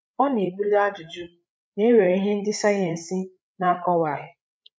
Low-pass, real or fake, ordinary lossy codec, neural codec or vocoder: none; fake; none; codec, 16 kHz, 8 kbps, FreqCodec, larger model